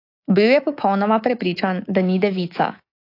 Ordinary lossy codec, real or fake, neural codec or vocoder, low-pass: AAC, 32 kbps; fake; codec, 24 kHz, 3.1 kbps, DualCodec; 5.4 kHz